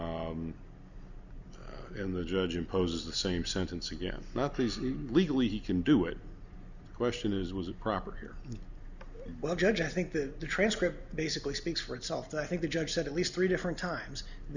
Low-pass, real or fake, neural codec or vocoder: 7.2 kHz; real; none